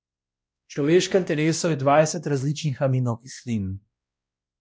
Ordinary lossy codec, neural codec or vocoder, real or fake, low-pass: none; codec, 16 kHz, 1 kbps, X-Codec, WavLM features, trained on Multilingual LibriSpeech; fake; none